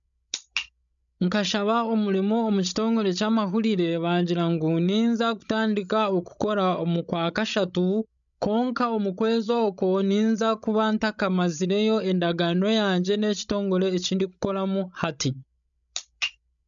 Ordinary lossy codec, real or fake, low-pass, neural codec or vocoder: none; fake; 7.2 kHz; codec, 16 kHz, 16 kbps, FreqCodec, larger model